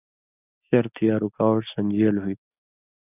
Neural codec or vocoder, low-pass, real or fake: none; 3.6 kHz; real